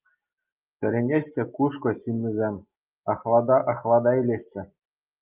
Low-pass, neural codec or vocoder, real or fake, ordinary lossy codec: 3.6 kHz; none; real; Opus, 24 kbps